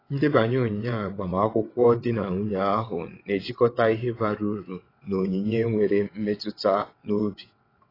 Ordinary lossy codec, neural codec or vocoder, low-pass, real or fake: AAC, 24 kbps; vocoder, 22.05 kHz, 80 mel bands, WaveNeXt; 5.4 kHz; fake